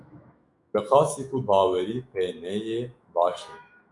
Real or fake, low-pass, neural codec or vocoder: fake; 10.8 kHz; autoencoder, 48 kHz, 128 numbers a frame, DAC-VAE, trained on Japanese speech